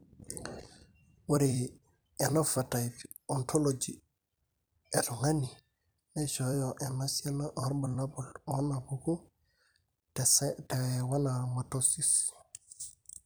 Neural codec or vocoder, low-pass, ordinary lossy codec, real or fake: vocoder, 44.1 kHz, 128 mel bands every 256 samples, BigVGAN v2; none; none; fake